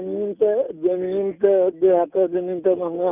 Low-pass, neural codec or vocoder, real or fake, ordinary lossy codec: 3.6 kHz; none; real; none